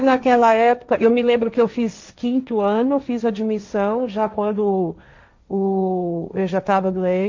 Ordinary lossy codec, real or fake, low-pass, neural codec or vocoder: MP3, 64 kbps; fake; 7.2 kHz; codec, 16 kHz, 1.1 kbps, Voila-Tokenizer